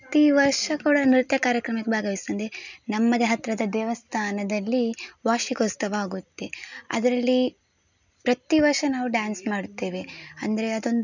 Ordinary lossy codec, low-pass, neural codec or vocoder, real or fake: none; 7.2 kHz; none; real